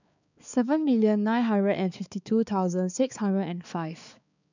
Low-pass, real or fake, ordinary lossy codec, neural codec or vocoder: 7.2 kHz; fake; none; codec, 16 kHz, 4 kbps, X-Codec, HuBERT features, trained on balanced general audio